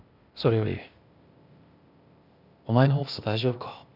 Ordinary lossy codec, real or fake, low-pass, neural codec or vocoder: none; fake; 5.4 kHz; codec, 16 kHz, 0.8 kbps, ZipCodec